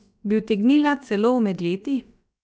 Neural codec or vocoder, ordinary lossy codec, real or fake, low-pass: codec, 16 kHz, about 1 kbps, DyCAST, with the encoder's durations; none; fake; none